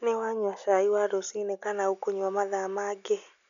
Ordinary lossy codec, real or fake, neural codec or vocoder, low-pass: none; real; none; 7.2 kHz